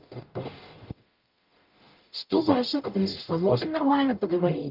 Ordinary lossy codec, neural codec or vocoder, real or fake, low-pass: Opus, 32 kbps; codec, 44.1 kHz, 0.9 kbps, DAC; fake; 5.4 kHz